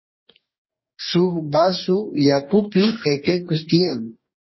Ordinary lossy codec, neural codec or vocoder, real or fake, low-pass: MP3, 24 kbps; codec, 44.1 kHz, 2.6 kbps, DAC; fake; 7.2 kHz